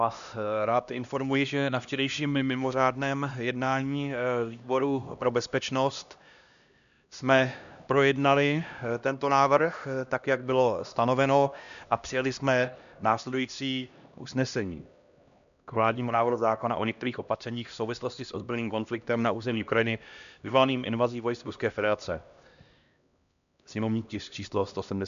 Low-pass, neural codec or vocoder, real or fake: 7.2 kHz; codec, 16 kHz, 1 kbps, X-Codec, HuBERT features, trained on LibriSpeech; fake